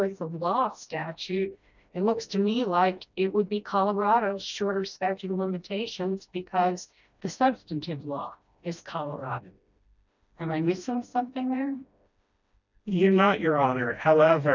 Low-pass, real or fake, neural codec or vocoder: 7.2 kHz; fake; codec, 16 kHz, 1 kbps, FreqCodec, smaller model